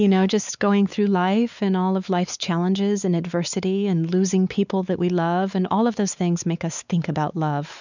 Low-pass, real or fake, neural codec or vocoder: 7.2 kHz; fake; codec, 16 kHz, 4 kbps, X-Codec, WavLM features, trained on Multilingual LibriSpeech